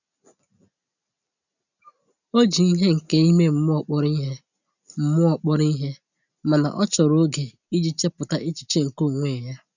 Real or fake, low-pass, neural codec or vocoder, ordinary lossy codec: real; 7.2 kHz; none; none